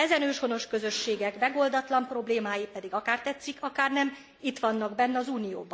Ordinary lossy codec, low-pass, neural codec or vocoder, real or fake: none; none; none; real